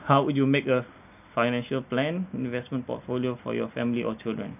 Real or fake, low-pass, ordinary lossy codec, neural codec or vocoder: real; 3.6 kHz; none; none